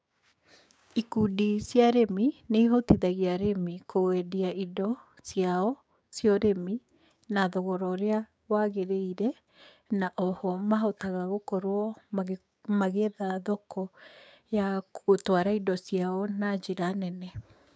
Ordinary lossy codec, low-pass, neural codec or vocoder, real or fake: none; none; codec, 16 kHz, 6 kbps, DAC; fake